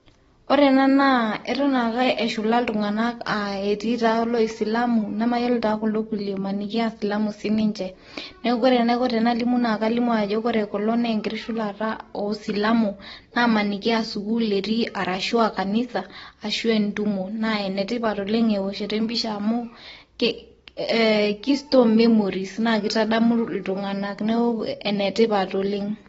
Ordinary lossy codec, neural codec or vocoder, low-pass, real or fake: AAC, 24 kbps; none; 19.8 kHz; real